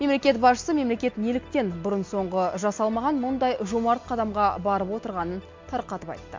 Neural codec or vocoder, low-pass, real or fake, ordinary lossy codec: none; 7.2 kHz; real; MP3, 48 kbps